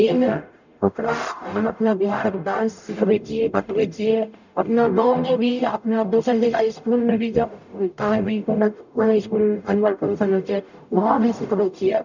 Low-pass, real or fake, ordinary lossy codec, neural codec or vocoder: 7.2 kHz; fake; none; codec, 44.1 kHz, 0.9 kbps, DAC